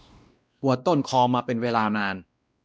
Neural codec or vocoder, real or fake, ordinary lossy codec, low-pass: codec, 16 kHz, 1 kbps, X-Codec, WavLM features, trained on Multilingual LibriSpeech; fake; none; none